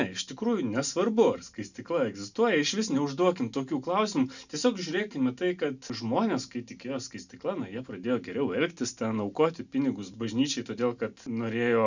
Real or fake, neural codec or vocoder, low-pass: real; none; 7.2 kHz